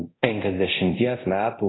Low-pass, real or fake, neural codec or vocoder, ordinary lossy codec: 7.2 kHz; fake; codec, 24 kHz, 0.9 kbps, DualCodec; AAC, 16 kbps